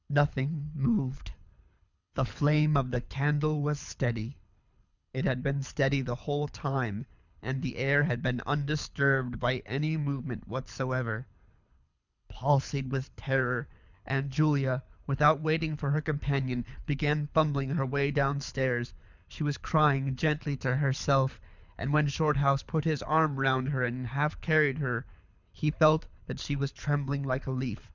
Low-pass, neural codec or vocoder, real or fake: 7.2 kHz; codec, 24 kHz, 6 kbps, HILCodec; fake